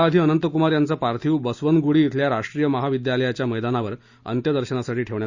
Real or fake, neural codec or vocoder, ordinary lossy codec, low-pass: real; none; Opus, 64 kbps; 7.2 kHz